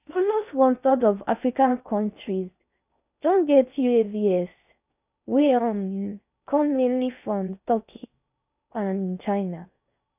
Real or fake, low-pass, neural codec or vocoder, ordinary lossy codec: fake; 3.6 kHz; codec, 16 kHz in and 24 kHz out, 0.6 kbps, FocalCodec, streaming, 4096 codes; none